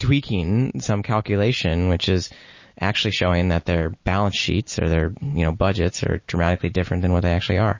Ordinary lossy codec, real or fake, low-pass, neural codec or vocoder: MP3, 32 kbps; real; 7.2 kHz; none